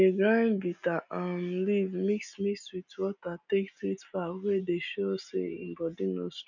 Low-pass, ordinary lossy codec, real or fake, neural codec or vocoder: 7.2 kHz; none; real; none